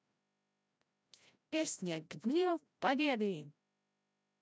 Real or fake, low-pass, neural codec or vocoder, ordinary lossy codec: fake; none; codec, 16 kHz, 0.5 kbps, FreqCodec, larger model; none